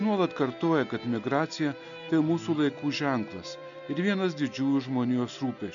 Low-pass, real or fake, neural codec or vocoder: 7.2 kHz; real; none